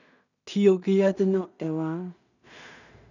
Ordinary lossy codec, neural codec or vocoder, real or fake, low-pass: none; codec, 16 kHz in and 24 kHz out, 0.4 kbps, LongCat-Audio-Codec, two codebook decoder; fake; 7.2 kHz